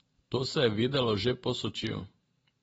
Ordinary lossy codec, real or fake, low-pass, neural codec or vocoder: AAC, 24 kbps; fake; 19.8 kHz; vocoder, 44.1 kHz, 128 mel bands every 512 samples, BigVGAN v2